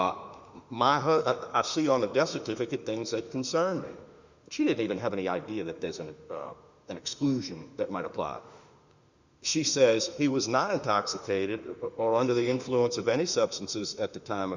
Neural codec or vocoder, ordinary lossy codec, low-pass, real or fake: autoencoder, 48 kHz, 32 numbers a frame, DAC-VAE, trained on Japanese speech; Opus, 64 kbps; 7.2 kHz; fake